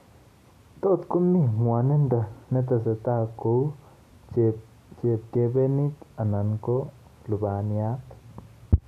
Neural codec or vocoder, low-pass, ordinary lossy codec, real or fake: none; 14.4 kHz; none; real